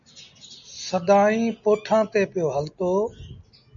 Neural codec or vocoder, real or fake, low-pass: none; real; 7.2 kHz